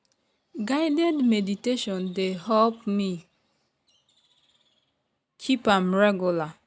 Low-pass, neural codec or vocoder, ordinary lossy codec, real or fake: none; none; none; real